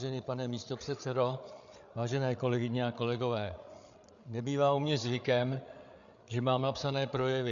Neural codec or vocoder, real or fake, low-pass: codec, 16 kHz, 8 kbps, FreqCodec, larger model; fake; 7.2 kHz